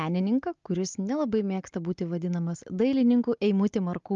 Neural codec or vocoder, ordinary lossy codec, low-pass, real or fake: none; Opus, 32 kbps; 7.2 kHz; real